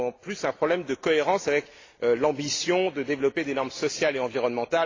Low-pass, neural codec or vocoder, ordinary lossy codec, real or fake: 7.2 kHz; none; AAC, 32 kbps; real